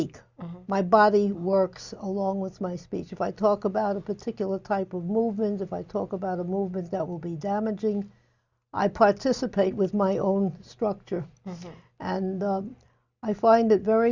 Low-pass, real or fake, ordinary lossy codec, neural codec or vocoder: 7.2 kHz; real; Opus, 64 kbps; none